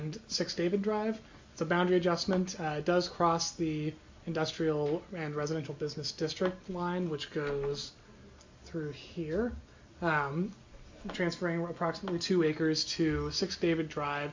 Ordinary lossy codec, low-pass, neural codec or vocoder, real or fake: MP3, 64 kbps; 7.2 kHz; none; real